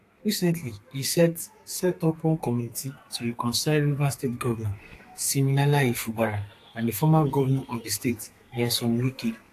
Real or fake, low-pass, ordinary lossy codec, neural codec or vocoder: fake; 14.4 kHz; AAC, 64 kbps; codec, 32 kHz, 1.9 kbps, SNAC